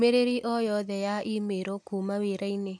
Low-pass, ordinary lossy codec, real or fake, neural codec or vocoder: none; none; real; none